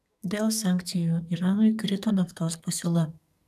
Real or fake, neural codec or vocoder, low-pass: fake; codec, 44.1 kHz, 2.6 kbps, SNAC; 14.4 kHz